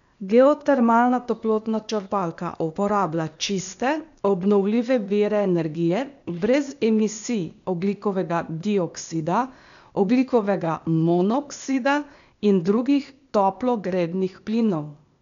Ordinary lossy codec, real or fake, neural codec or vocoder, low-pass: MP3, 96 kbps; fake; codec, 16 kHz, 0.8 kbps, ZipCodec; 7.2 kHz